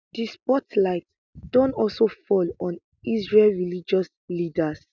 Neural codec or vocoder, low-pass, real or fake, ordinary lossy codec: none; 7.2 kHz; real; none